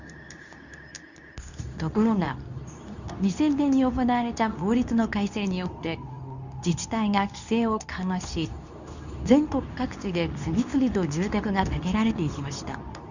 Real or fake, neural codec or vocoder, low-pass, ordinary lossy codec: fake; codec, 24 kHz, 0.9 kbps, WavTokenizer, medium speech release version 2; 7.2 kHz; none